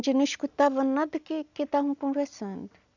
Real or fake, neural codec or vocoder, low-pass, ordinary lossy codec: real; none; 7.2 kHz; none